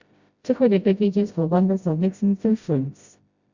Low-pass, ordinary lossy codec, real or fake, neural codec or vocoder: 7.2 kHz; Opus, 64 kbps; fake; codec, 16 kHz, 0.5 kbps, FreqCodec, smaller model